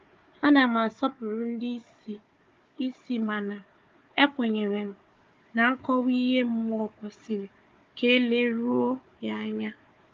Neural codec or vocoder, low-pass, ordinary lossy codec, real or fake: codec, 16 kHz, 4 kbps, FunCodec, trained on Chinese and English, 50 frames a second; 7.2 kHz; Opus, 32 kbps; fake